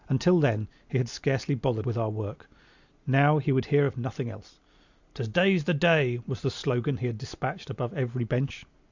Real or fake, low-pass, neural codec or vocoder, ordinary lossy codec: real; 7.2 kHz; none; Opus, 64 kbps